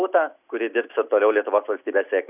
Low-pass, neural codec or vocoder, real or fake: 3.6 kHz; none; real